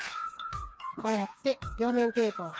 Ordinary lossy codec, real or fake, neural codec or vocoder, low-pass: none; fake; codec, 16 kHz, 4 kbps, FreqCodec, smaller model; none